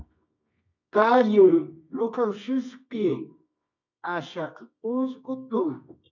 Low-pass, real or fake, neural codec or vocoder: 7.2 kHz; fake; codec, 24 kHz, 0.9 kbps, WavTokenizer, medium music audio release